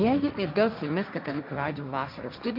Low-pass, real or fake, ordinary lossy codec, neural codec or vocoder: 5.4 kHz; fake; AAC, 48 kbps; codec, 16 kHz, 1.1 kbps, Voila-Tokenizer